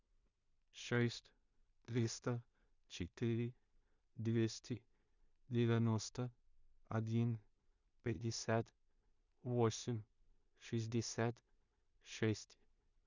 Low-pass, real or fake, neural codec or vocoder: 7.2 kHz; fake; codec, 16 kHz in and 24 kHz out, 0.4 kbps, LongCat-Audio-Codec, two codebook decoder